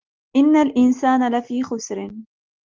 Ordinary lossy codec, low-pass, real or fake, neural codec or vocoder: Opus, 24 kbps; 7.2 kHz; real; none